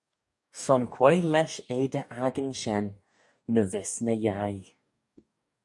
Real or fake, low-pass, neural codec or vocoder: fake; 10.8 kHz; codec, 44.1 kHz, 2.6 kbps, DAC